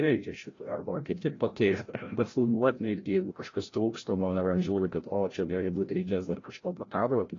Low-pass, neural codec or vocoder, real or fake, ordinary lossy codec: 7.2 kHz; codec, 16 kHz, 0.5 kbps, FreqCodec, larger model; fake; AAC, 32 kbps